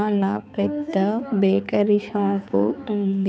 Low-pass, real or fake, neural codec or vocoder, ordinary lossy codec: none; fake; codec, 16 kHz, 4 kbps, X-Codec, HuBERT features, trained on balanced general audio; none